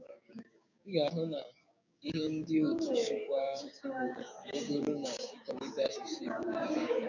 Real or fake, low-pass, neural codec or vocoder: fake; 7.2 kHz; codec, 16 kHz, 6 kbps, DAC